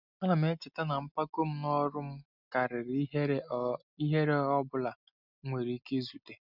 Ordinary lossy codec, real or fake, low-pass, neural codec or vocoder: none; real; 5.4 kHz; none